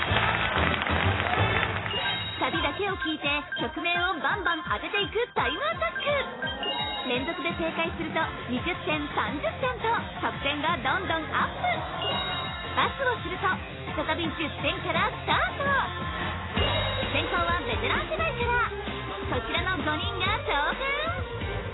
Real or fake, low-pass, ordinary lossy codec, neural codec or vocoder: real; 7.2 kHz; AAC, 16 kbps; none